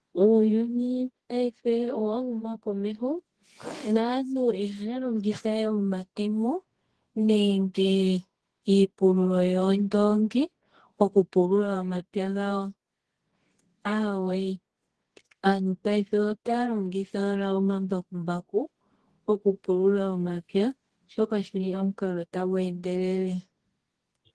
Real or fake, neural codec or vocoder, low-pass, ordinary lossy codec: fake; codec, 24 kHz, 0.9 kbps, WavTokenizer, medium music audio release; 10.8 kHz; Opus, 16 kbps